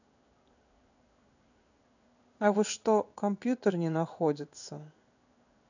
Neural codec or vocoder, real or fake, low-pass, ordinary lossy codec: codec, 16 kHz in and 24 kHz out, 1 kbps, XY-Tokenizer; fake; 7.2 kHz; none